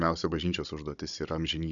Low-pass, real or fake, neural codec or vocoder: 7.2 kHz; fake; codec, 16 kHz, 16 kbps, FunCodec, trained on Chinese and English, 50 frames a second